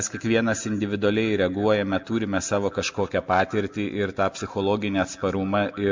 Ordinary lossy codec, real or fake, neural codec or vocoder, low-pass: MP3, 64 kbps; real; none; 7.2 kHz